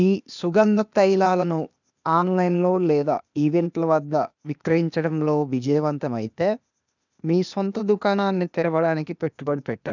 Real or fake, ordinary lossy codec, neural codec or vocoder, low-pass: fake; none; codec, 16 kHz, 0.8 kbps, ZipCodec; 7.2 kHz